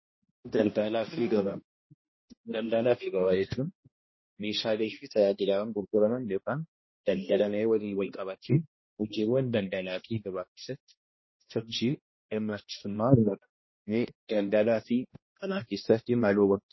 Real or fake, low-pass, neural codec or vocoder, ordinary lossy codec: fake; 7.2 kHz; codec, 16 kHz, 1 kbps, X-Codec, HuBERT features, trained on balanced general audio; MP3, 24 kbps